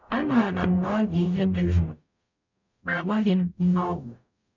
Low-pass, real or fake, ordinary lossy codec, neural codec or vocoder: 7.2 kHz; fake; none; codec, 44.1 kHz, 0.9 kbps, DAC